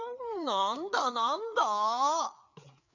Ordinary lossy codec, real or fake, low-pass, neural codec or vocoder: none; fake; 7.2 kHz; codec, 16 kHz, 4 kbps, FreqCodec, larger model